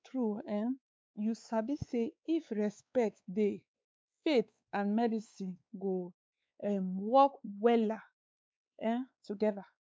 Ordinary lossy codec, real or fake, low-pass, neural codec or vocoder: none; fake; none; codec, 16 kHz, 4 kbps, X-Codec, WavLM features, trained on Multilingual LibriSpeech